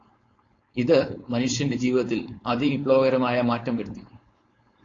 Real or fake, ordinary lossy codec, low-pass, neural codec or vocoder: fake; AAC, 32 kbps; 7.2 kHz; codec, 16 kHz, 4.8 kbps, FACodec